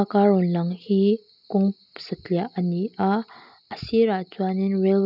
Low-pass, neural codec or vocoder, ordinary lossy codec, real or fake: 5.4 kHz; none; none; real